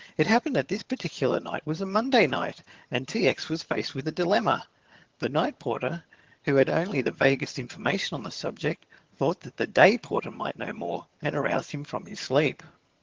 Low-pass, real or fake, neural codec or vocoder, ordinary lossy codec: 7.2 kHz; fake; vocoder, 22.05 kHz, 80 mel bands, HiFi-GAN; Opus, 16 kbps